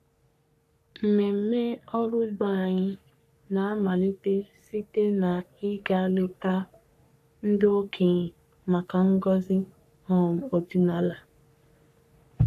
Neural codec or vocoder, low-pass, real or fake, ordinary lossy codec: codec, 44.1 kHz, 3.4 kbps, Pupu-Codec; 14.4 kHz; fake; none